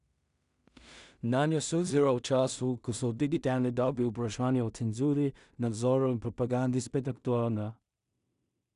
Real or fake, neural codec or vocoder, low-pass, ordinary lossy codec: fake; codec, 16 kHz in and 24 kHz out, 0.4 kbps, LongCat-Audio-Codec, two codebook decoder; 10.8 kHz; none